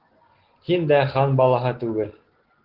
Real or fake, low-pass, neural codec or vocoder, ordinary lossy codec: real; 5.4 kHz; none; Opus, 16 kbps